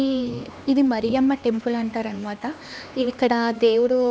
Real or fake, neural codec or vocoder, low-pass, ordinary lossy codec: fake; codec, 16 kHz, 2 kbps, X-Codec, HuBERT features, trained on LibriSpeech; none; none